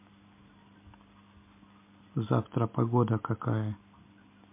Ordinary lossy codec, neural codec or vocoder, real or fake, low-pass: MP3, 24 kbps; none; real; 3.6 kHz